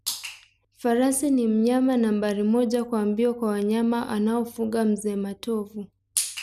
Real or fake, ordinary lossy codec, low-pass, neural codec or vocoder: real; none; 14.4 kHz; none